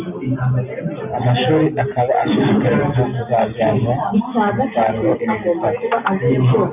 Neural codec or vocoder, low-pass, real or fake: none; 3.6 kHz; real